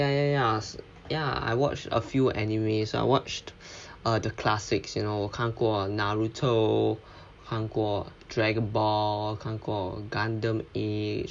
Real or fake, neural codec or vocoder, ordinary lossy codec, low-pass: real; none; none; 9.9 kHz